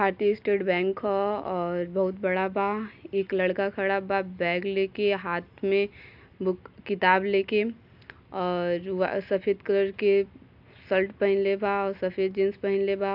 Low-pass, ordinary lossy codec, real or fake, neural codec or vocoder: 5.4 kHz; AAC, 48 kbps; real; none